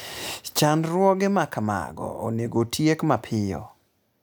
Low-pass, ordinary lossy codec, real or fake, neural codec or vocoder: none; none; real; none